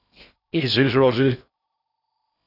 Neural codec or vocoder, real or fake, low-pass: codec, 16 kHz in and 24 kHz out, 0.6 kbps, FocalCodec, streaming, 2048 codes; fake; 5.4 kHz